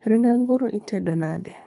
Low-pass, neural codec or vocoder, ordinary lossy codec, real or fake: 10.8 kHz; codec, 24 kHz, 3 kbps, HILCodec; none; fake